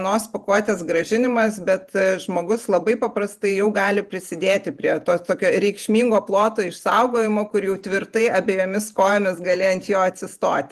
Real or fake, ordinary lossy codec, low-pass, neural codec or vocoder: fake; Opus, 32 kbps; 14.4 kHz; vocoder, 48 kHz, 128 mel bands, Vocos